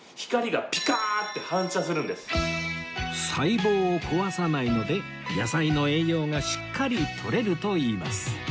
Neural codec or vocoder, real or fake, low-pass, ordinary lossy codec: none; real; none; none